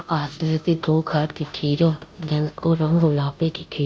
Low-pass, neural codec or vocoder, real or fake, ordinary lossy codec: none; codec, 16 kHz, 0.5 kbps, FunCodec, trained on Chinese and English, 25 frames a second; fake; none